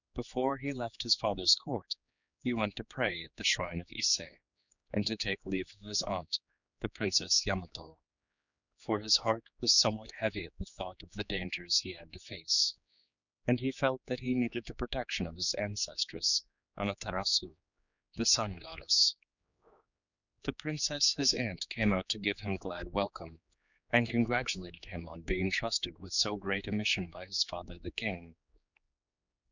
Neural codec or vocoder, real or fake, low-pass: codec, 16 kHz, 4 kbps, X-Codec, HuBERT features, trained on general audio; fake; 7.2 kHz